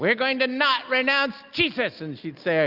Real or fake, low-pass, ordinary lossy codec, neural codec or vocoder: real; 5.4 kHz; Opus, 24 kbps; none